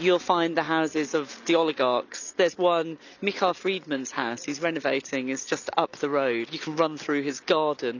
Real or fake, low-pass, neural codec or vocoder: real; 7.2 kHz; none